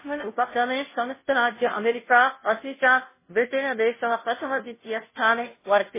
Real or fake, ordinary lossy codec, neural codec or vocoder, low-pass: fake; MP3, 16 kbps; codec, 16 kHz, 0.5 kbps, FunCodec, trained on Chinese and English, 25 frames a second; 3.6 kHz